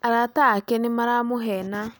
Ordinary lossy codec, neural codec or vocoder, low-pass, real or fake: none; none; none; real